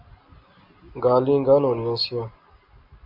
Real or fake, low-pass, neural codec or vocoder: real; 5.4 kHz; none